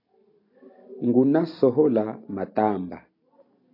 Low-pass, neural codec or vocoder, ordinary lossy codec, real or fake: 5.4 kHz; none; AAC, 32 kbps; real